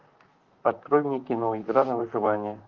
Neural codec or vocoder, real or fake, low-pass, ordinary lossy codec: vocoder, 44.1 kHz, 128 mel bands, Pupu-Vocoder; fake; 7.2 kHz; Opus, 32 kbps